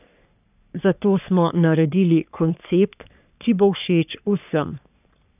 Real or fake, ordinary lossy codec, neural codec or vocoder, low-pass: fake; none; codec, 44.1 kHz, 3.4 kbps, Pupu-Codec; 3.6 kHz